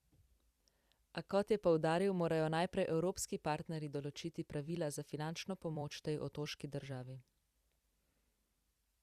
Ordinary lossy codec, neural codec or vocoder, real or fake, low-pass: Opus, 64 kbps; vocoder, 44.1 kHz, 128 mel bands every 256 samples, BigVGAN v2; fake; 14.4 kHz